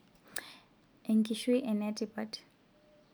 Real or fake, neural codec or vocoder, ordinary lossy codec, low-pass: real; none; none; none